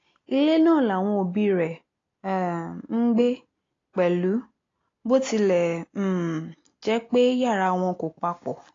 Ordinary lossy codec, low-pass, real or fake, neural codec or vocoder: AAC, 32 kbps; 7.2 kHz; real; none